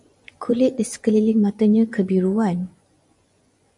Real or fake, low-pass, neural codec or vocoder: fake; 10.8 kHz; vocoder, 24 kHz, 100 mel bands, Vocos